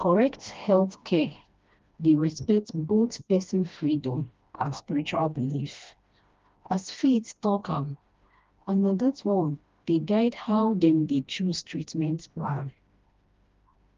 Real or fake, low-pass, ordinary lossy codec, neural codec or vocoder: fake; 7.2 kHz; Opus, 32 kbps; codec, 16 kHz, 1 kbps, FreqCodec, smaller model